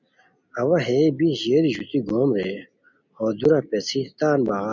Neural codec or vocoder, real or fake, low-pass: none; real; 7.2 kHz